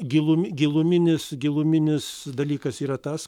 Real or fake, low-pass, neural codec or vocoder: fake; 19.8 kHz; codec, 44.1 kHz, 7.8 kbps, Pupu-Codec